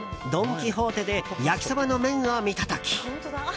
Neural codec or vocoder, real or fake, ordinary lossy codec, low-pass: none; real; none; none